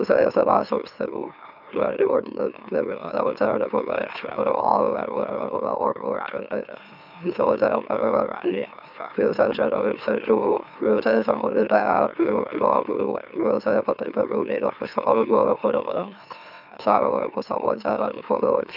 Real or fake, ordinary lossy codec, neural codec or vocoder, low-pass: fake; none; autoencoder, 44.1 kHz, a latent of 192 numbers a frame, MeloTTS; 5.4 kHz